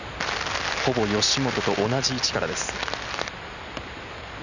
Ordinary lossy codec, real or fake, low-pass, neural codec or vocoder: none; real; 7.2 kHz; none